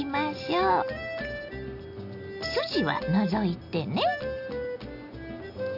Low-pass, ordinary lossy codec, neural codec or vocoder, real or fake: 5.4 kHz; none; none; real